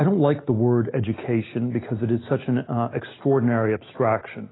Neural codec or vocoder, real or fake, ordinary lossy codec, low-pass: none; real; AAC, 16 kbps; 7.2 kHz